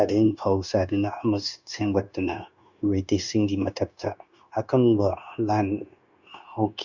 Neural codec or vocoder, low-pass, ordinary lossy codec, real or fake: codec, 16 kHz, 0.9 kbps, LongCat-Audio-Codec; 7.2 kHz; Opus, 64 kbps; fake